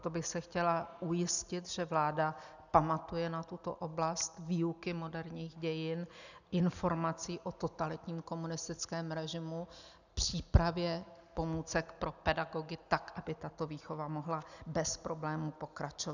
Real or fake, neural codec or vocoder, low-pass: real; none; 7.2 kHz